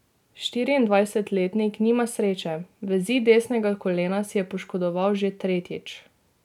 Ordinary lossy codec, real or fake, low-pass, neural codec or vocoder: none; real; 19.8 kHz; none